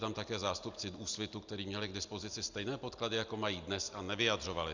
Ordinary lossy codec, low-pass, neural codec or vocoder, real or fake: Opus, 64 kbps; 7.2 kHz; none; real